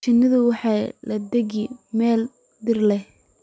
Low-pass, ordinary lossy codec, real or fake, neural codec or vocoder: none; none; real; none